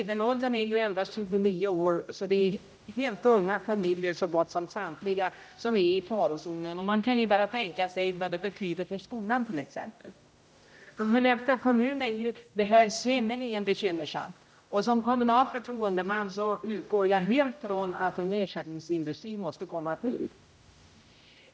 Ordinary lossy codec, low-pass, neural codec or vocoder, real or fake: none; none; codec, 16 kHz, 0.5 kbps, X-Codec, HuBERT features, trained on general audio; fake